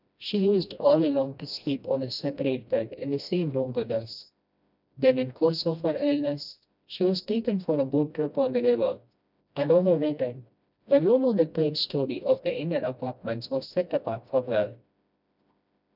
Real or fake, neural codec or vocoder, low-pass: fake; codec, 16 kHz, 1 kbps, FreqCodec, smaller model; 5.4 kHz